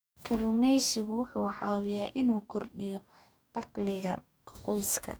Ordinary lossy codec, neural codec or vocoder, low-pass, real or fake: none; codec, 44.1 kHz, 2.6 kbps, DAC; none; fake